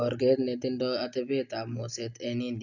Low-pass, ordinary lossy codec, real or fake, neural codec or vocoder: 7.2 kHz; none; real; none